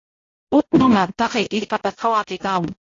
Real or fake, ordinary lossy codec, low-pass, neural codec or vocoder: fake; AAC, 32 kbps; 7.2 kHz; codec, 16 kHz, 0.5 kbps, X-Codec, HuBERT features, trained on balanced general audio